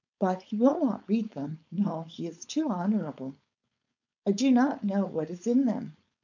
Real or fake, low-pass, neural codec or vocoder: fake; 7.2 kHz; codec, 16 kHz, 4.8 kbps, FACodec